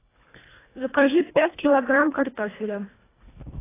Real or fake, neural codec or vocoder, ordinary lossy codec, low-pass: fake; codec, 24 kHz, 1.5 kbps, HILCodec; AAC, 16 kbps; 3.6 kHz